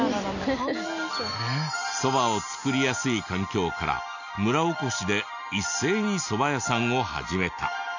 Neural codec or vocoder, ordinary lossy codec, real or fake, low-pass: none; none; real; 7.2 kHz